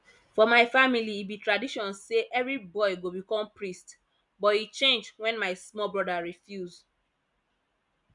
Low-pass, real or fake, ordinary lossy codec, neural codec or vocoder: 10.8 kHz; real; none; none